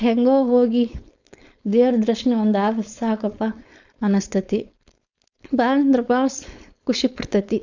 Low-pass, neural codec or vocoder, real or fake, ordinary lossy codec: 7.2 kHz; codec, 16 kHz, 4.8 kbps, FACodec; fake; none